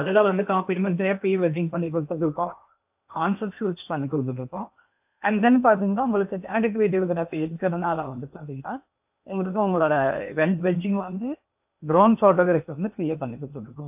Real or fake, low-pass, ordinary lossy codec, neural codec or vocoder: fake; 3.6 kHz; AAC, 32 kbps; codec, 16 kHz in and 24 kHz out, 0.8 kbps, FocalCodec, streaming, 65536 codes